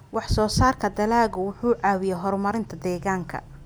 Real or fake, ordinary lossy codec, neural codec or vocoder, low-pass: real; none; none; none